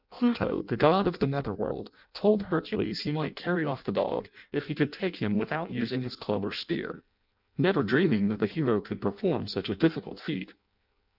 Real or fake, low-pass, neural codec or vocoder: fake; 5.4 kHz; codec, 16 kHz in and 24 kHz out, 0.6 kbps, FireRedTTS-2 codec